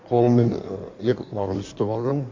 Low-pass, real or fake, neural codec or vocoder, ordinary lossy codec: 7.2 kHz; fake; codec, 16 kHz in and 24 kHz out, 1.1 kbps, FireRedTTS-2 codec; MP3, 48 kbps